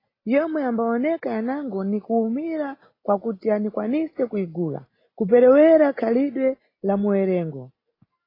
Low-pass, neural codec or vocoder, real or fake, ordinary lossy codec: 5.4 kHz; none; real; AAC, 24 kbps